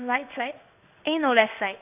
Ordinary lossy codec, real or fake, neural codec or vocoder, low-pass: none; fake; codec, 16 kHz in and 24 kHz out, 1 kbps, XY-Tokenizer; 3.6 kHz